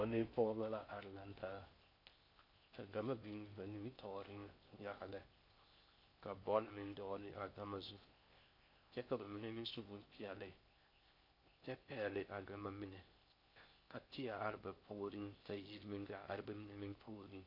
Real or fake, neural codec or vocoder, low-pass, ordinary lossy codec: fake; codec, 16 kHz in and 24 kHz out, 0.6 kbps, FocalCodec, streaming, 4096 codes; 5.4 kHz; MP3, 32 kbps